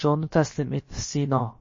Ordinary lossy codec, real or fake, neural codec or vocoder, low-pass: MP3, 32 kbps; fake; codec, 16 kHz, about 1 kbps, DyCAST, with the encoder's durations; 7.2 kHz